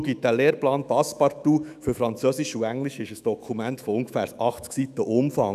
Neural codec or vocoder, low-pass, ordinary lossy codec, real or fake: autoencoder, 48 kHz, 128 numbers a frame, DAC-VAE, trained on Japanese speech; 14.4 kHz; none; fake